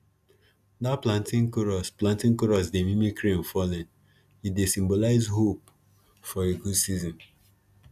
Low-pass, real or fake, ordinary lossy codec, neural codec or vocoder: 14.4 kHz; real; none; none